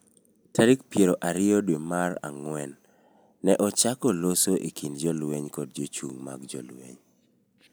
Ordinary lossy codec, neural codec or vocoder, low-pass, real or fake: none; none; none; real